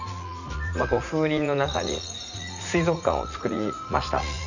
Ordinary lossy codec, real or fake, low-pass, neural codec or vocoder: none; fake; 7.2 kHz; vocoder, 44.1 kHz, 80 mel bands, Vocos